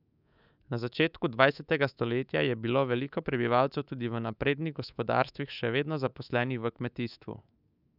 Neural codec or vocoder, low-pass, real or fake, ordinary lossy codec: codec, 24 kHz, 3.1 kbps, DualCodec; 5.4 kHz; fake; none